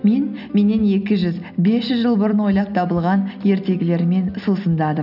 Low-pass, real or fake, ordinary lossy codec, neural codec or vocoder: 5.4 kHz; real; none; none